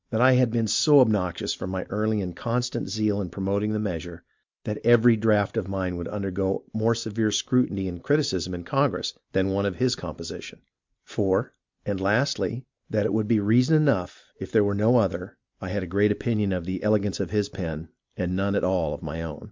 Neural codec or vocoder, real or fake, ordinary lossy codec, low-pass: none; real; MP3, 64 kbps; 7.2 kHz